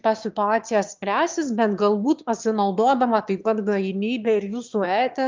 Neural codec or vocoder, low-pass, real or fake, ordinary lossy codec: autoencoder, 22.05 kHz, a latent of 192 numbers a frame, VITS, trained on one speaker; 7.2 kHz; fake; Opus, 32 kbps